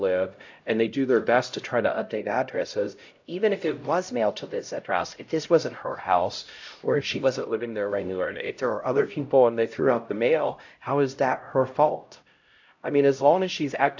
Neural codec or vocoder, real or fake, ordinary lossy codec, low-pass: codec, 16 kHz, 0.5 kbps, X-Codec, HuBERT features, trained on LibriSpeech; fake; AAC, 48 kbps; 7.2 kHz